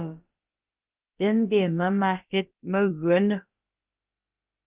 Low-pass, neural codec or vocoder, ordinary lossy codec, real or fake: 3.6 kHz; codec, 16 kHz, about 1 kbps, DyCAST, with the encoder's durations; Opus, 24 kbps; fake